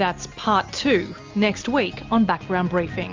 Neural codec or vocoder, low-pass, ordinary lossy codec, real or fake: none; 7.2 kHz; Opus, 32 kbps; real